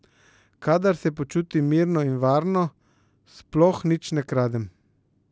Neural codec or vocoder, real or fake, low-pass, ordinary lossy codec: none; real; none; none